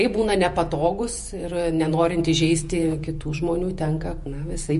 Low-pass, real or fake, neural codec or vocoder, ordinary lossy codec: 14.4 kHz; fake; vocoder, 48 kHz, 128 mel bands, Vocos; MP3, 48 kbps